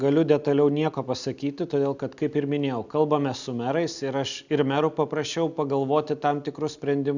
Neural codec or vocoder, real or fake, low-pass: none; real; 7.2 kHz